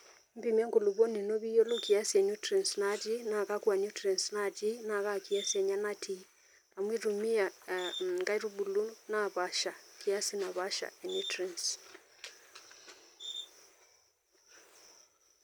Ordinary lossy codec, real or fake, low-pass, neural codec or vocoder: none; real; none; none